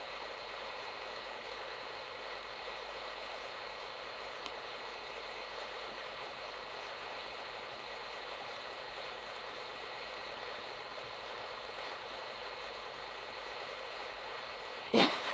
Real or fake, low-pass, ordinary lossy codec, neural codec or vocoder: fake; none; none; codec, 16 kHz, 4.8 kbps, FACodec